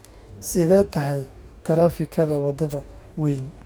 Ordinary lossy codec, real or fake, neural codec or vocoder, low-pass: none; fake; codec, 44.1 kHz, 2.6 kbps, DAC; none